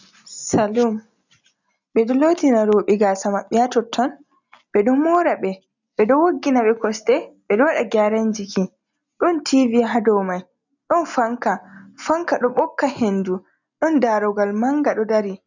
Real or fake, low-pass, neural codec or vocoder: real; 7.2 kHz; none